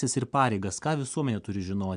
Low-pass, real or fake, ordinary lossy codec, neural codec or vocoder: 9.9 kHz; real; MP3, 96 kbps; none